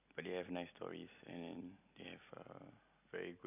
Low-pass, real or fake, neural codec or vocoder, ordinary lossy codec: 3.6 kHz; real; none; none